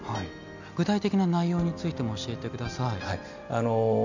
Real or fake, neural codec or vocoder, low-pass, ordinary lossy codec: real; none; 7.2 kHz; none